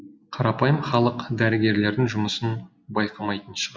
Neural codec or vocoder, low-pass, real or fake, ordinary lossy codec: none; none; real; none